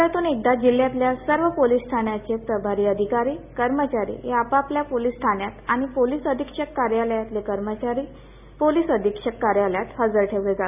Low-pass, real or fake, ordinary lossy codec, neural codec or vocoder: 3.6 kHz; real; none; none